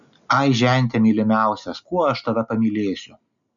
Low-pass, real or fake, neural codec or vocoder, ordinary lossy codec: 7.2 kHz; real; none; MP3, 96 kbps